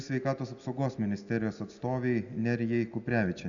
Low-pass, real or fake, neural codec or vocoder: 7.2 kHz; real; none